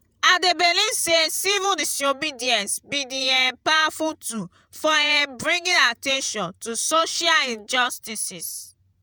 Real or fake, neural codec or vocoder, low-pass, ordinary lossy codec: fake; vocoder, 48 kHz, 128 mel bands, Vocos; none; none